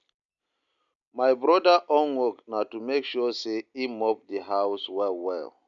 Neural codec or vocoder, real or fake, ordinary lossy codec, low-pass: none; real; none; 7.2 kHz